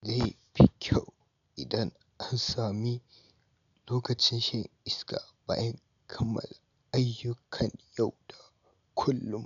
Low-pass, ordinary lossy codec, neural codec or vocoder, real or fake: 7.2 kHz; none; none; real